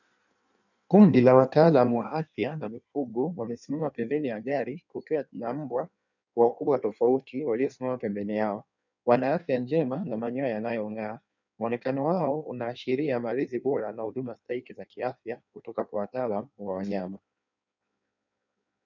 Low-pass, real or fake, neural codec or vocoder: 7.2 kHz; fake; codec, 16 kHz in and 24 kHz out, 1.1 kbps, FireRedTTS-2 codec